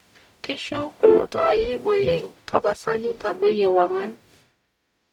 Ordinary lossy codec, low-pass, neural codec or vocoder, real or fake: none; 19.8 kHz; codec, 44.1 kHz, 0.9 kbps, DAC; fake